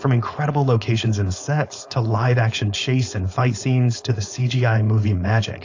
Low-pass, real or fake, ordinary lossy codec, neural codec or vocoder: 7.2 kHz; fake; AAC, 48 kbps; codec, 16 kHz, 4.8 kbps, FACodec